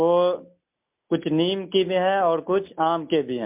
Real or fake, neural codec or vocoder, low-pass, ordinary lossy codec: real; none; 3.6 kHz; MP3, 32 kbps